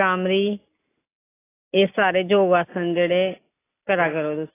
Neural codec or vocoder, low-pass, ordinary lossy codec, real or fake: none; 3.6 kHz; AAC, 16 kbps; real